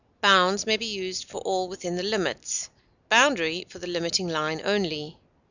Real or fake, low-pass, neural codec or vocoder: real; 7.2 kHz; none